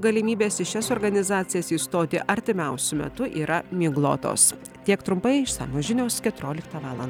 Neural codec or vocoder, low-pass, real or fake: none; 19.8 kHz; real